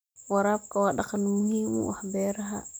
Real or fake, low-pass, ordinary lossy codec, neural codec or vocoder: real; none; none; none